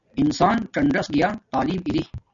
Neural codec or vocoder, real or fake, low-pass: none; real; 7.2 kHz